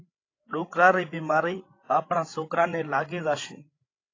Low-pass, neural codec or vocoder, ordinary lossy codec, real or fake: 7.2 kHz; codec, 16 kHz, 16 kbps, FreqCodec, larger model; AAC, 32 kbps; fake